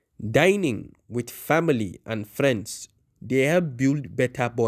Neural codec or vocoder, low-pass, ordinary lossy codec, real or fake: none; 14.4 kHz; none; real